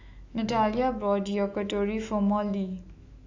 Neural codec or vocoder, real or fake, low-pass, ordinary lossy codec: autoencoder, 48 kHz, 128 numbers a frame, DAC-VAE, trained on Japanese speech; fake; 7.2 kHz; AAC, 48 kbps